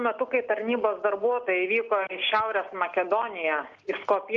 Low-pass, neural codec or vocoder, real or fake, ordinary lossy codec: 7.2 kHz; none; real; Opus, 24 kbps